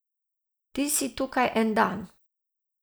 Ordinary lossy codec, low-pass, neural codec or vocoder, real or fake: none; none; none; real